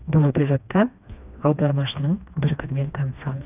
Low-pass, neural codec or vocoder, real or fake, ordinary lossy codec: 3.6 kHz; codec, 16 kHz, 2 kbps, FreqCodec, smaller model; fake; none